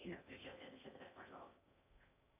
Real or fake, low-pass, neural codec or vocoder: fake; 3.6 kHz; codec, 16 kHz in and 24 kHz out, 0.6 kbps, FocalCodec, streaming, 4096 codes